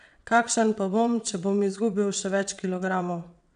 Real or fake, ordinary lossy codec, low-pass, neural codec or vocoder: fake; none; 9.9 kHz; vocoder, 22.05 kHz, 80 mel bands, WaveNeXt